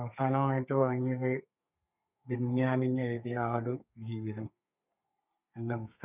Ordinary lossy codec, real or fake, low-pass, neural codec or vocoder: none; fake; 3.6 kHz; codec, 32 kHz, 1.9 kbps, SNAC